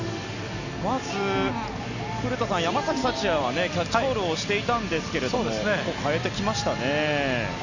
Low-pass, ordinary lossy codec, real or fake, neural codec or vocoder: 7.2 kHz; none; real; none